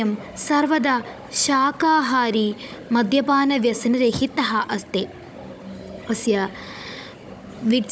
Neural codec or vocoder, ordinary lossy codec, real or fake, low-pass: codec, 16 kHz, 16 kbps, FunCodec, trained on Chinese and English, 50 frames a second; none; fake; none